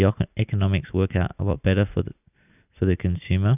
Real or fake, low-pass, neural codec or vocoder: real; 3.6 kHz; none